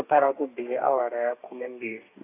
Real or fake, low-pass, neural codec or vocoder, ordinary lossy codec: fake; 3.6 kHz; codec, 32 kHz, 1.9 kbps, SNAC; AAC, 24 kbps